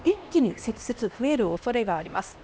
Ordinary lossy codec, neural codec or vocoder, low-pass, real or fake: none; codec, 16 kHz, 1 kbps, X-Codec, HuBERT features, trained on LibriSpeech; none; fake